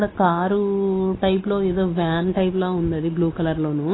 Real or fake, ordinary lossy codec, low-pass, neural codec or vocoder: real; AAC, 16 kbps; 7.2 kHz; none